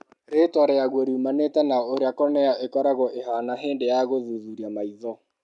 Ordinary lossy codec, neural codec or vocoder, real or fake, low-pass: none; none; real; 10.8 kHz